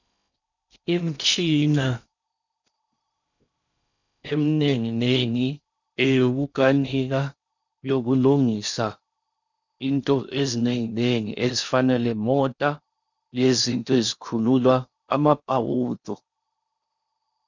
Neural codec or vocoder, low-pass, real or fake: codec, 16 kHz in and 24 kHz out, 0.6 kbps, FocalCodec, streaming, 4096 codes; 7.2 kHz; fake